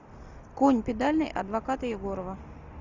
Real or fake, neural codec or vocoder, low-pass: real; none; 7.2 kHz